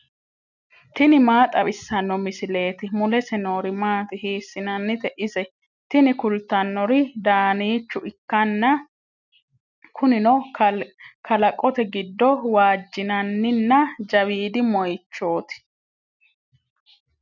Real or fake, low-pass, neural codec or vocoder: real; 7.2 kHz; none